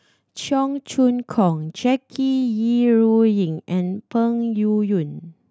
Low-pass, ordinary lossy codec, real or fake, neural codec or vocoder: none; none; real; none